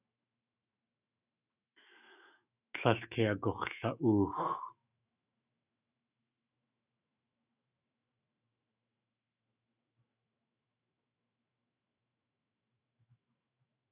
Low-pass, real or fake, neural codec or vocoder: 3.6 kHz; fake; autoencoder, 48 kHz, 128 numbers a frame, DAC-VAE, trained on Japanese speech